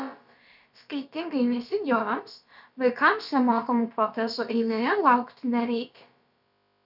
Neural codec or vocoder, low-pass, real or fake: codec, 16 kHz, about 1 kbps, DyCAST, with the encoder's durations; 5.4 kHz; fake